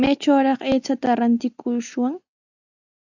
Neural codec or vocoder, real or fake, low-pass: none; real; 7.2 kHz